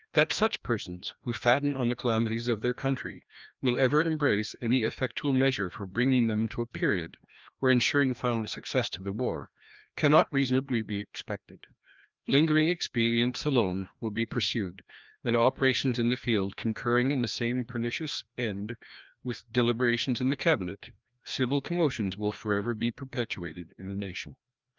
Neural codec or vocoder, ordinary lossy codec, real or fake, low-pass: codec, 16 kHz, 1 kbps, FreqCodec, larger model; Opus, 24 kbps; fake; 7.2 kHz